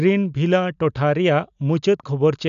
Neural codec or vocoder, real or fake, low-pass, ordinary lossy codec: none; real; 7.2 kHz; none